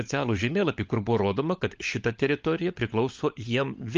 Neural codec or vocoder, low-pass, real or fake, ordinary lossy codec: codec, 16 kHz, 16 kbps, FunCodec, trained on LibriTTS, 50 frames a second; 7.2 kHz; fake; Opus, 32 kbps